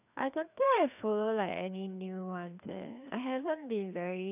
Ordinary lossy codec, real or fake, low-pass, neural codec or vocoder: none; fake; 3.6 kHz; codec, 16 kHz, 2 kbps, FreqCodec, larger model